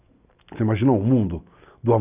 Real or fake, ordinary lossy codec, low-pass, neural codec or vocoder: real; none; 3.6 kHz; none